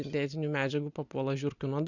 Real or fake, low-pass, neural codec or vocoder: real; 7.2 kHz; none